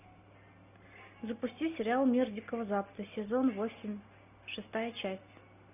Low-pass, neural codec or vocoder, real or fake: 3.6 kHz; none; real